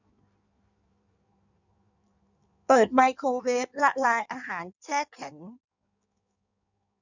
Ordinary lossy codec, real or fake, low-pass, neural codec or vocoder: none; fake; 7.2 kHz; codec, 16 kHz in and 24 kHz out, 1.1 kbps, FireRedTTS-2 codec